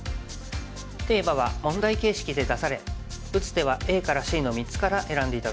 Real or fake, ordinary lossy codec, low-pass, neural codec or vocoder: real; none; none; none